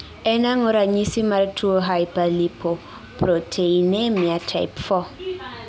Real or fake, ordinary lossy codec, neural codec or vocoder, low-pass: real; none; none; none